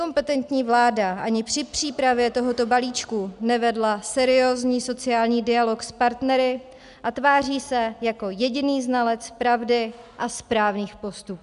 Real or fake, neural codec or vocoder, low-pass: real; none; 10.8 kHz